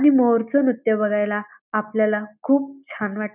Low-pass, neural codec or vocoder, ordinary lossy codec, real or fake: 3.6 kHz; none; none; real